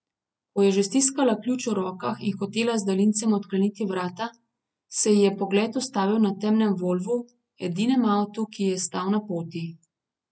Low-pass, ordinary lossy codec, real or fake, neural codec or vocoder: none; none; real; none